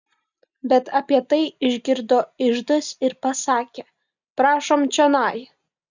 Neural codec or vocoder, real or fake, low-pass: none; real; 7.2 kHz